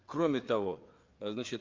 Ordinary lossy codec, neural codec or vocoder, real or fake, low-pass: Opus, 32 kbps; codec, 16 kHz, 4 kbps, FreqCodec, larger model; fake; 7.2 kHz